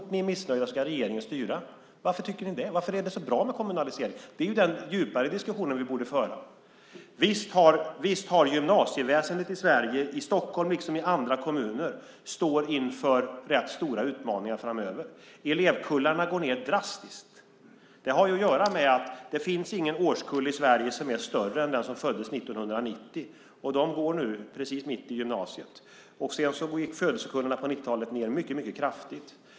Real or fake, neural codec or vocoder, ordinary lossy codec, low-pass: real; none; none; none